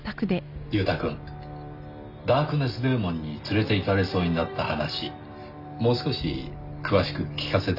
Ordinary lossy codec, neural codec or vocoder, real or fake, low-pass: none; none; real; 5.4 kHz